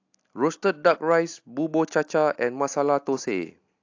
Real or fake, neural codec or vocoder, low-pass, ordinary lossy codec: real; none; 7.2 kHz; AAC, 48 kbps